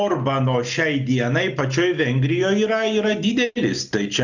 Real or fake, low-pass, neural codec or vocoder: real; 7.2 kHz; none